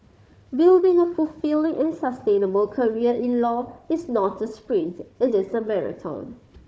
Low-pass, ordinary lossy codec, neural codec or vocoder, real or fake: none; none; codec, 16 kHz, 4 kbps, FunCodec, trained on Chinese and English, 50 frames a second; fake